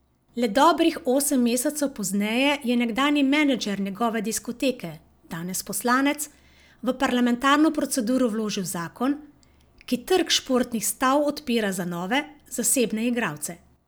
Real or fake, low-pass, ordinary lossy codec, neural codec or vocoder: real; none; none; none